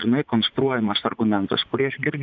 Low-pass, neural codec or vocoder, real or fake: 7.2 kHz; vocoder, 44.1 kHz, 80 mel bands, Vocos; fake